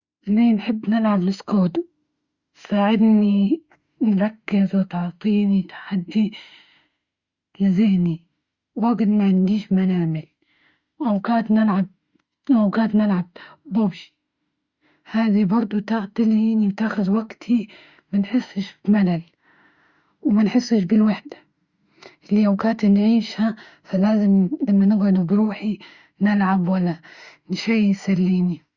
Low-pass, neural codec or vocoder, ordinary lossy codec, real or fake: 7.2 kHz; autoencoder, 48 kHz, 32 numbers a frame, DAC-VAE, trained on Japanese speech; Opus, 64 kbps; fake